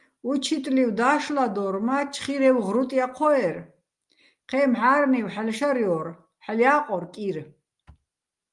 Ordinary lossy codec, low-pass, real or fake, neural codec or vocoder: Opus, 32 kbps; 10.8 kHz; real; none